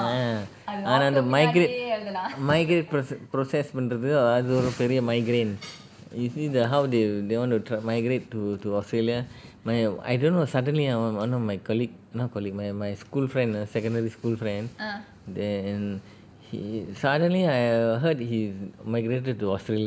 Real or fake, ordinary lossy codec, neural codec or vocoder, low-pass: real; none; none; none